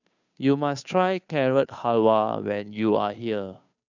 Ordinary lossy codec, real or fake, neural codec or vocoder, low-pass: none; fake; codec, 16 kHz, 2 kbps, FunCodec, trained on Chinese and English, 25 frames a second; 7.2 kHz